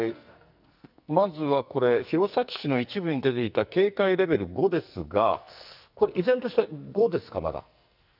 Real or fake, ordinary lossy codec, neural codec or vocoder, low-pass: fake; none; codec, 44.1 kHz, 2.6 kbps, SNAC; 5.4 kHz